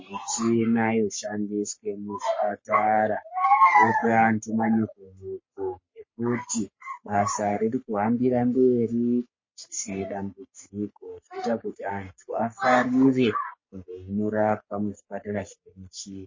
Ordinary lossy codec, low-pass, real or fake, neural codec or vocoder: MP3, 32 kbps; 7.2 kHz; fake; codec, 44.1 kHz, 3.4 kbps, Pupu-Codec